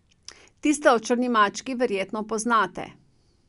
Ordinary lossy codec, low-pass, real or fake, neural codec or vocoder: none; 10.8 kHz; real; none